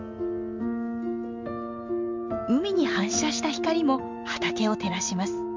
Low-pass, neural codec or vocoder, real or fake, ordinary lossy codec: 7.2 kHz; none; real; MP3, 64 kbps